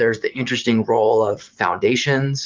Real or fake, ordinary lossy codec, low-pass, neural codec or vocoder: fake; Opus, 24 kbps; 7.2 kHz; vocoder, 44.1 kHz, 80 mel bands, Vocos